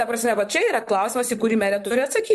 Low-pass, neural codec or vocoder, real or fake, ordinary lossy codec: 14.4 kHz; vocoder, 44.1 kHz, 128 mel bands, Pupu-Vocoder; fake; MP3, 64 kbps